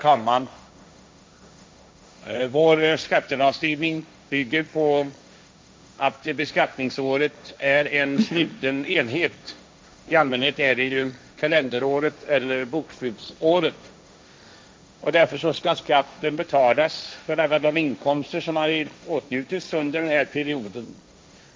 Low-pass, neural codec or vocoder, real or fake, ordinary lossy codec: none; codec, 16 kHz, 1.1 kbps, Voila-Tokenizer; fake; none